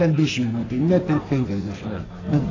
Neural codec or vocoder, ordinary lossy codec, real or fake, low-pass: codec, 44.1 kHz, 2.6 kbps, SNAC; AAC, 32 kbps; fake; 7.2 kHz